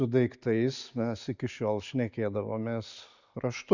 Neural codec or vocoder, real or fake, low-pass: none; real; 7.2 kHz